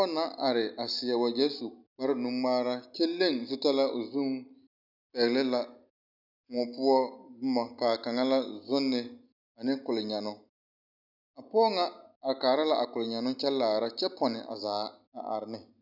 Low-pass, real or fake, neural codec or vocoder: 5.4 kHz; real; none